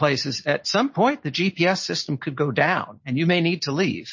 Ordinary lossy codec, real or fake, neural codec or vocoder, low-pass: MP3, 32 kbps; real; none; 7.2 kHz